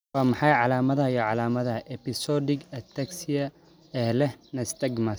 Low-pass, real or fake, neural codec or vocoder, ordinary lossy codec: none; real; none; none